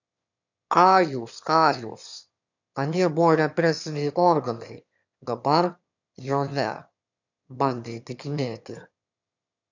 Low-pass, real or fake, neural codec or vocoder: 7.2 kHz; fake; autoencoder, 22.05 kHz, a latent of 192 numbers a frame, VITS, trained on one speaker